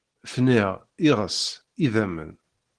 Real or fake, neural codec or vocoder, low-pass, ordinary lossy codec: real; none; 9.9 kHz; Opus, 16 kbps